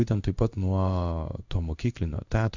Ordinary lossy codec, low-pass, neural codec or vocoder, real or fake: Opus, 64 kbps; 7.2 kHz; codec, 16 kHz in and 24 kHz out, 1 kbps, XY-Tokenizer; fake